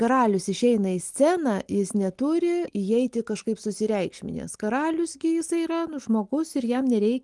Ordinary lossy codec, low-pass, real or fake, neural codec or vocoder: Opus, 24 kbps; 10.8 kHz; real; none